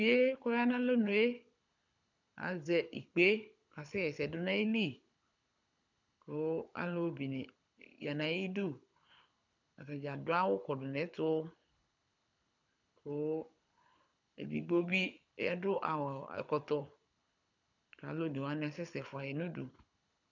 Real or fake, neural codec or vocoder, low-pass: fake; codec, 24 kHz, 6 kbps, HILCodec; 7.2 kHz